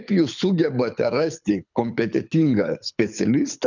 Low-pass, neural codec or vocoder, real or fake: 7.2 kHz; codec, 24 kHz, 6 kbps, HILCodec; fake